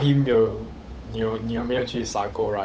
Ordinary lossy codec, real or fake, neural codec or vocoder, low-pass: none; fake; codec, 16 kHz, 8 kbps, FunCodec, trained on Chinese and English, 25 frames a second; none